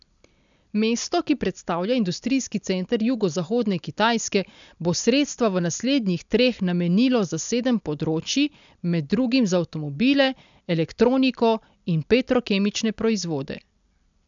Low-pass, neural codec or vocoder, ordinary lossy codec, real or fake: 7.2 kHz; none; MP3, 96 kbps; real